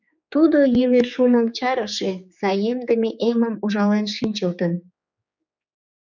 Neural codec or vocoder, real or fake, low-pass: codec, 16 kHz, 4 kbps, X-Codec, HuBERT features, trained on general audio; fake; 7.2 kHz